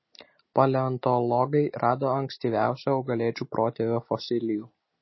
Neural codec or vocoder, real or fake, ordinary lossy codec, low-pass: none; real; MP3, 24 kbps; 7.2 kHz